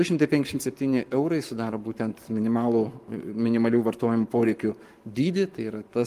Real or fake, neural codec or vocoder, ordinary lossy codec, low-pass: fake; codec, 44.1 kHz, 7.8 kbps, Pupu-Codec; Opus, 32 kbps; 14.4 kHz